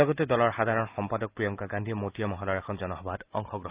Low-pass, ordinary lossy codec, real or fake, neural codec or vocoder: 3.6 kHz; Opus, 24 kbps; real; none